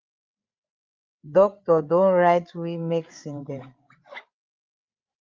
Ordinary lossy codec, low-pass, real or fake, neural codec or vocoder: Opus, 32 kbps; 7.2 kHz; fake; codec, 16 kHz, 8 kbps, FreqCodec, larger model